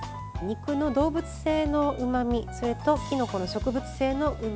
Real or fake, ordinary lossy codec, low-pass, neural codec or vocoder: real; none; none; none